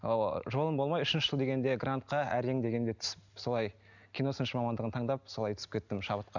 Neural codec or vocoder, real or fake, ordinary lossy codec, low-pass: none; real; none; 7.2 kHz